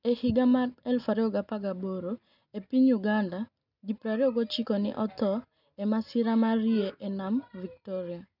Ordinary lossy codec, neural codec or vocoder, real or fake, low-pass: none; none; real; 5.4 kHz